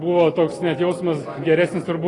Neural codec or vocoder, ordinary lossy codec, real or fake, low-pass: none; AAC, 32 kbps; real; 10.8 kHz